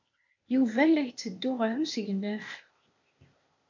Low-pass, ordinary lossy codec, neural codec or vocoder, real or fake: 7.2 kHz; MP3, 48 kbps; codec, 16 kHz, 0.8 kbps, ZipCodec; fake